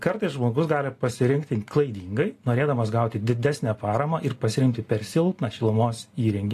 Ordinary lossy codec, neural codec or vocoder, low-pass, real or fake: AAC, 48 kbps; none; 14.4 kHz; real